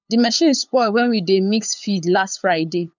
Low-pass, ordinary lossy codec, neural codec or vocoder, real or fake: 7.2 kHz; none; codec, 16 kHz, 8 kbps, FunCodec, trained on LibriTTS, 25 frames a second; fake